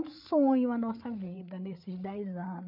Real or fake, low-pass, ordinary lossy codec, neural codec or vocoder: fake; 5.4 kHz; none; codec, 16 kHz, 8 kbps, FreqCodec, larger model